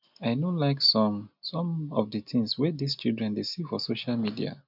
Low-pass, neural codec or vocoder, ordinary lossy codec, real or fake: 5.4 kHz; none; none; real